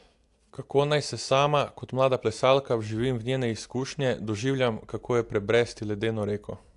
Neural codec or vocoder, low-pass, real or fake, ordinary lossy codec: none; 10.8 kHz; real; AAC, 64 kbps